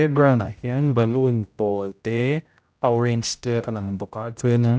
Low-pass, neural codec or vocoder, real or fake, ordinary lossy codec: none; codec, 16 kHz, 0.5 kbps, X-Codec, HuBERT features, trained on general audio; fake; none